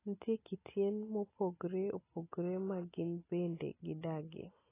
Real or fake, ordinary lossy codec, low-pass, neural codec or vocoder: real; AAC, 16 kbps; 3.6 kHz; none